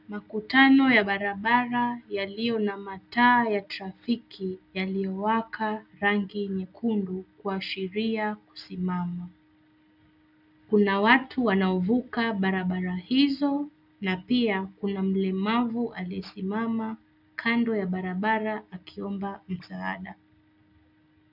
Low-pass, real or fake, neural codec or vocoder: 5.4 kHz; real; none